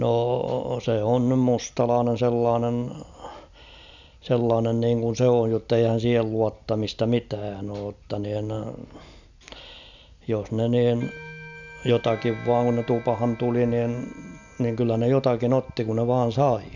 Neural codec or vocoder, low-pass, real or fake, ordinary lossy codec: none; 7.2 kHz; real; none